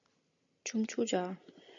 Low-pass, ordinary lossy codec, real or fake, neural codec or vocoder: 7.2 kHz; MP3, 64 kbps; real; none